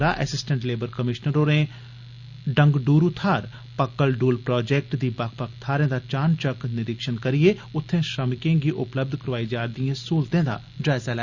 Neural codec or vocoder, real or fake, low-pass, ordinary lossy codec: none; real; 7.2 kHz; Opus, 64 kbps